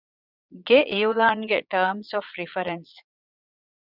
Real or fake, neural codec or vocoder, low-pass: fake; vocoder, 22.05 kHz, 80 mel bands, Vocos; 5.4 kHz